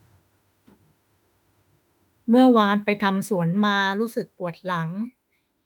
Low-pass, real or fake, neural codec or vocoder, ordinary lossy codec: 19.8 kHz; fake; autoencoder, 48 kHz, 32 numbers a frame, DAC-VAE, trained on Japanese speech; none